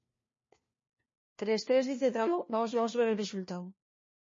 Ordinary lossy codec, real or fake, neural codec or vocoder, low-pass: MP3, 32 kbps; fake; codec, 16 kHz, 1 kbps, FunCodec, trained on LibriTTS, 50 frames a second; 7.2 kHz